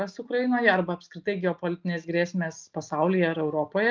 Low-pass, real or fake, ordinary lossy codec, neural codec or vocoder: 7.2 kHz; real; Opus, 32 kbps; none